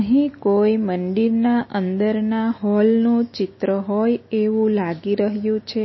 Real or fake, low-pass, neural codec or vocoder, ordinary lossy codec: real; 7.2 kHz; none; MP3, 24 kbps